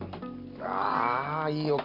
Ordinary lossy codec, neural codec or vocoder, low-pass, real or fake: none; none; 5.4 kHz; real